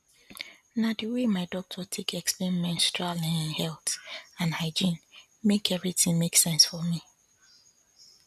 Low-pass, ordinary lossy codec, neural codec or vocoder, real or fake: 14.4 kHz; none; none; real